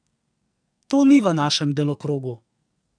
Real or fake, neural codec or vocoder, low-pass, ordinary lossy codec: fake; codec, 44.1 kHz, 2.6 kbps, SNAC; 9.9 kHz; MP3, 96 kbps